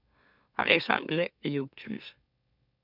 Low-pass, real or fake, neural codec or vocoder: 5.4 kHz; fake; autoencoder, 44.1 kHz, a latent of 192 numbers a frame, MeloTTS